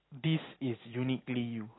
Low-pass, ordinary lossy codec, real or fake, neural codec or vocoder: 7.2 kHz; AAC, 16 kbps; real; none